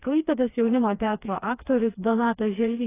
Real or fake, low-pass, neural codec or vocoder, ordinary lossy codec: fake; 3.6 kHz; codec, 16 kHz, 2 kbps, FreqCodec, smaller model; AAC, 16 kbps